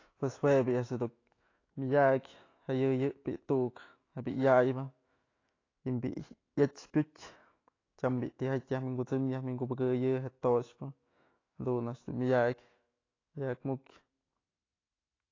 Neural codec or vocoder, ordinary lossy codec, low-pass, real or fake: autoencoder, 48 kHz, 128 numbers a frame, DAC-VAE, trained on Japanese speech; AAC, 32 kbps; 7.2 kHz; fake